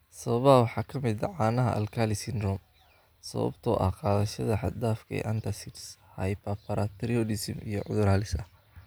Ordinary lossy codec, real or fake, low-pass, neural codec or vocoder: none; real; none; none